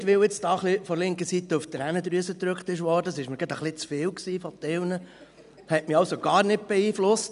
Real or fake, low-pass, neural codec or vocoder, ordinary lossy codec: real; 10.8 kHz; none; none